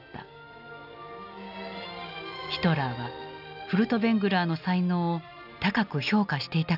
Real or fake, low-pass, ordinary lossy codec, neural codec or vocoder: real; 5.4 kHz; none; none